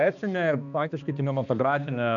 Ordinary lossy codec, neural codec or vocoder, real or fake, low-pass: MP3, 96 kbps; codec, 16 kHz, 2 kbps, X-Codec, HuBERT features, trained on balanced general audio; fake; 7.2 kHz